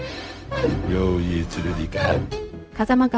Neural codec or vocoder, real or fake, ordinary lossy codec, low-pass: codec, 16 kHz, 0.4 kbps, LongCat-Audio-Codec; fake; none; none